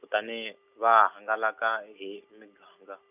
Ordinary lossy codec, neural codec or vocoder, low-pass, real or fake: none; none; 3.6 kHz; real